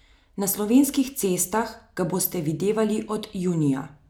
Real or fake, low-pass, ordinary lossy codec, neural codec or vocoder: real; none; none; none